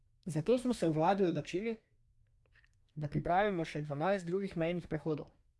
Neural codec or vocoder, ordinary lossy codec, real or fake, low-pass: codec, 24 kHz, 1 kbps, SNAC; none; fake; none